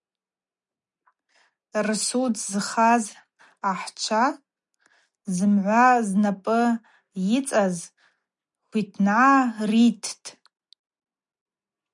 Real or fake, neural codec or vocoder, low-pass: real; none; 10.8 kHz